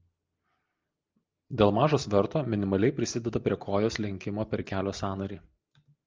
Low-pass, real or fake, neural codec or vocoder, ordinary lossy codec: 7.2 kHz; real; none; Opus, 32 kbps